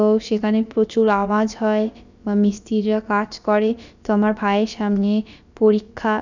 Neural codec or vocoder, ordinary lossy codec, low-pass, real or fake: codec, 16 kHz, about 1 kbps, DyCAST, with the encoder's durations; none; 7.2 kHz; fake